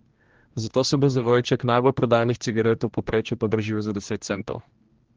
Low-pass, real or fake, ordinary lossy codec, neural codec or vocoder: 7.2 kHz; fake; Opus, 16 kbps; codec, 16 kHz, 1 kbps, X-Codec, HuBERT features, trained on general audio